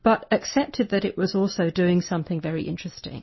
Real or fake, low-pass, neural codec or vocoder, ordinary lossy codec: real; 7.2 kHz; none; MP3, 24 kbps